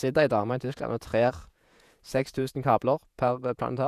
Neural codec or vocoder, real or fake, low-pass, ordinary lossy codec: vocoder, 48 kHz, 128 mel bands, Vocos; fake; 14.4 kHz; none